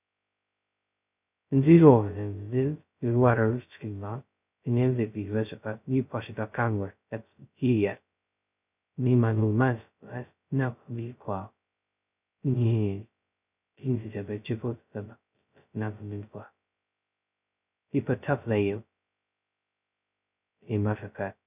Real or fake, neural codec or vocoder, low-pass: fake; codec, 16 kHz, 0.2 kbps, FocalCodec; 3.6 kHz